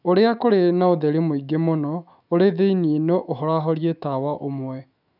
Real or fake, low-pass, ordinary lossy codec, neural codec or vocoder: fake; 5.4 kHz; none; autoencoder, 48 kHz, 128 numbers a frame, DAC-VAE, trained on Japanese speech